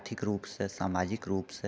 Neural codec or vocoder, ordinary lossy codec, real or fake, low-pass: none; none; real; none